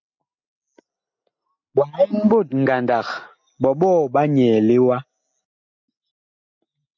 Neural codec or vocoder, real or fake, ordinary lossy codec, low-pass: none; real; MP3, 48 kbps; 7.2 kHz